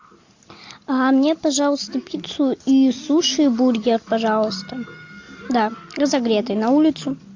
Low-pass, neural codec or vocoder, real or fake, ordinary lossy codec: 7.2 kHz; none; real; AAC, 48 kbps